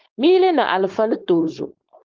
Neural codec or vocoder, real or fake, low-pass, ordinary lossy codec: codec, 16 kHz, 4.8 kbps, FACodec; fake; 7.2 kHz; Opus, 32 kbps